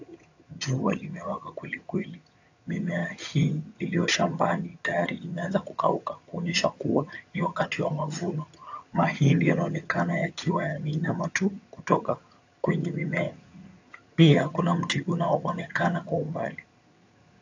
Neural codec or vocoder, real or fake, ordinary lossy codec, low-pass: vocoder, 22.05 kHz, 80 mel bands, HiFi-GAN; fake; AAC, 48 kbps; 7.2 kHz